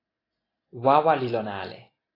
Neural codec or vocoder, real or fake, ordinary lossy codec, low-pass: none; real; AAC, 24 kbps; 5.4 kHz